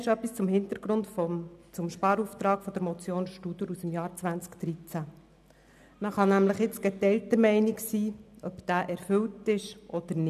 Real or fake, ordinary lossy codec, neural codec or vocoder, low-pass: real; none; none; 14.4 kHz